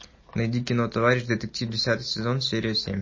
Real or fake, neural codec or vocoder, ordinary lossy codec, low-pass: real; none; MP3, 32 kbps; 7.2 kHz